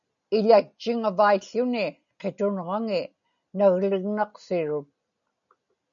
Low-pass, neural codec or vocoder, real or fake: 7.2 kHz; none; real